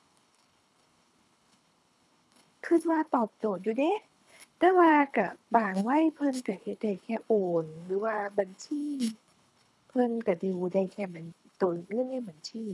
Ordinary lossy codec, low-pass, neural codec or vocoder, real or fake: none; none; codec, 24 kHz, 3 kbps, HILCodec; fake